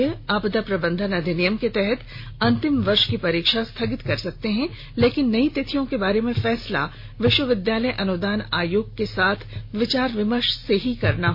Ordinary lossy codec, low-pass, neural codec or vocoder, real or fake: MP3, 32 kbps; 5.4 kHz; none; real